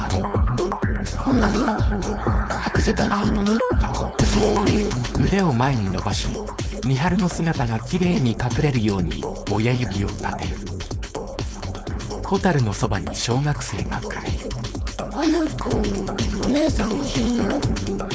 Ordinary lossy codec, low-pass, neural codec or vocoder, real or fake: none; none; codec, 16 kHz, 4.8 kbps, FACodec; fake